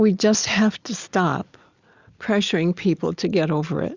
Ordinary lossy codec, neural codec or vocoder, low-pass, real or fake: Opus, 64 kbps; none; 7.2 kHz; real